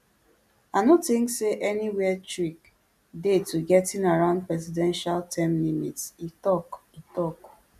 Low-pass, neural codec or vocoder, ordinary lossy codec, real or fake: 14.4 kHz; none; none; real